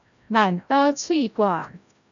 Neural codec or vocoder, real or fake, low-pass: codec, 16 kHz, 0.5 kbps, FreqCodec, larger model; fake; 7.2 kHz